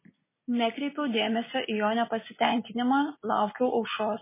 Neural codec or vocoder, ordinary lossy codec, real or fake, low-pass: none; MP3, 16 kbps; real; 3.6 kHz